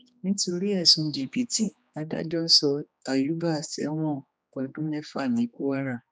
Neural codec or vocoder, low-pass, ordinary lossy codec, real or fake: codec, 16 kHz, 2 kbps, X-Codec, HuBERT features, trained on general audio; none; none; fake